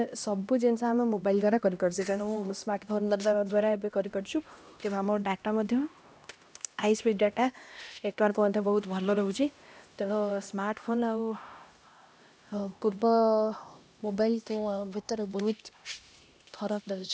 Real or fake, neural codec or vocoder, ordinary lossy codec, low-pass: fake; codec, 16 kHz, 1 kbps, X-Codec, HuBERT features, trained on LibriSpeech; none; none